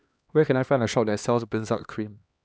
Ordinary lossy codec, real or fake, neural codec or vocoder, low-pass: none; fake; codec, 16 kHz, 2 kbps, X-Codec, HuBERT features, trained on LibriSpeech; none